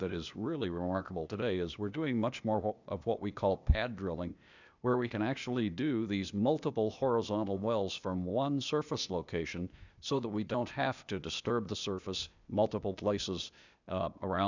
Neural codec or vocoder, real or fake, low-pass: codec, 16 kHz, 0.8 kbps, ZipCodec; fake; 7.2 kHz